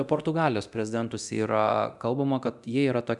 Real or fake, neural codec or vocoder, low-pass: fake; codec, 24 kHz, 0.9 kbps, DualCodec; 10.8 kHz